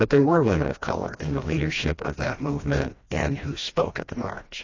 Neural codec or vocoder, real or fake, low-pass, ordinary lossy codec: codec, 16 kHz, 1 kbps, FreqCodec, smaller model; fake; 7.2 kHz; AAC, 32 kbps